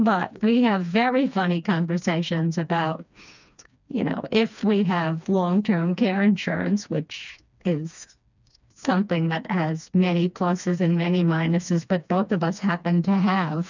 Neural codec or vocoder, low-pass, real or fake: codec, 16 kHz, 2 kbps, FreqCodec, smaller model; 7.2 kHz; fake